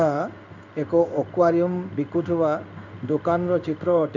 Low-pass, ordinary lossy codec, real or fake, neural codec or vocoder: 7.2 kHz; none; fake; codec, 16 kHz in and 24 kHz out, 1 kbps, XY-Tokenizer